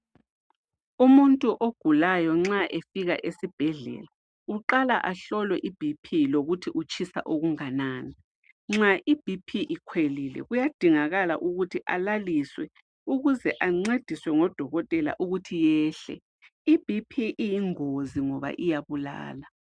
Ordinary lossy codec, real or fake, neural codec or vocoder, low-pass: MP3, 96 kbps; real; none; 9.9 kHz